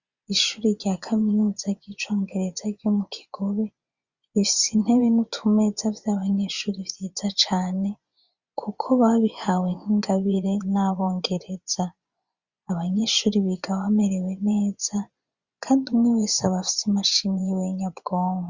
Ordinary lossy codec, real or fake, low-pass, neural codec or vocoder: Opus, 64 kbps; fake; 7.2 kHz; vocoder, 24 kHz, 100 mel bands, Vocos